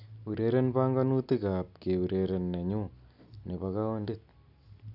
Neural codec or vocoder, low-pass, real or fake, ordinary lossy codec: none; 5.4 kHz; real; none